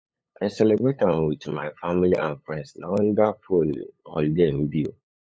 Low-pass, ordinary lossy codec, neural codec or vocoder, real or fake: none; none; codec, 16 kHz, 8 kbps, FunCodec, trained on LibriTTS, 25 frames a second; fake